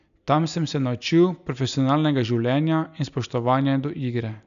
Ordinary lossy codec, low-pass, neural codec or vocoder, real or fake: none; 7.2 kHz; none; real